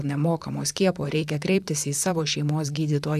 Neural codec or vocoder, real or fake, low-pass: vocoder, 44.1 kHz, 128 mel bands, Pupu-Vocoder; fake; 14.4 kHz